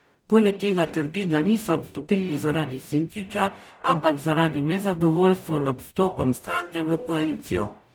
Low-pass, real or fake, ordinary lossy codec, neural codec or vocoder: none; fake; none; codec, 44.1 kHz, 0.9 kbps, DAC